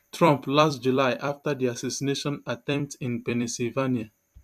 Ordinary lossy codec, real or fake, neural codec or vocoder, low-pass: none; fake; vocoder, 44.1 kHz, 128 mel bands every 256 samples, BigVGAN v2; 14.4 kHz